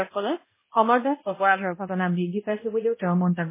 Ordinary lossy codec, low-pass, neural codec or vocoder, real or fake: MP3, 16 kbps; 3.6 kHz; codec, 16 kHz, 0.5 kbps, X-Codec, HuBERT features, trained on balanced general audio; fake